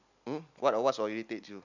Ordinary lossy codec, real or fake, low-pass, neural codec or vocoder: none; real; 7.2 kHz; none